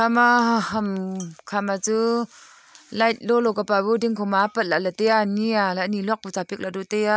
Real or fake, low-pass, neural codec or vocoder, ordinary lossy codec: real; none; none; none